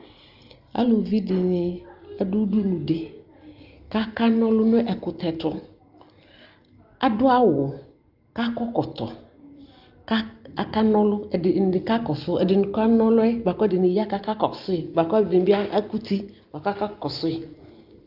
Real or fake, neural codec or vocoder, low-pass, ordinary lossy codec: real; none; 5.4 kHz; Opus, 24 kbps